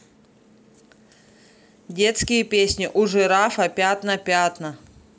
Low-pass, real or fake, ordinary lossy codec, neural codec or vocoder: none; real; none; none